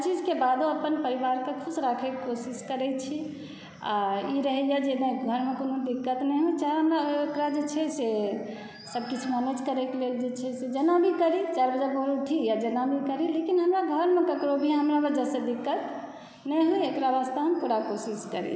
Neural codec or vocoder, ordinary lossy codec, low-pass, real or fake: none; none; none; real